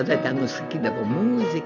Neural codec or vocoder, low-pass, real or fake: none; 7.2 kHz; real